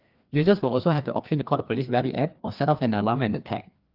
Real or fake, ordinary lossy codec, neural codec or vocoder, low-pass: fake; Opus, 24 kbps; codec, 16 kHz, 2 kbps, FreqCodec, larger model; 5.4 kHz